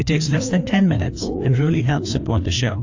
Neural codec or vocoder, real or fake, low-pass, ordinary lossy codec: codec, 16 kHz, 2 kbps, FreqCodec, larger model; fake; 7.2 kHz; AAC, 48 kbps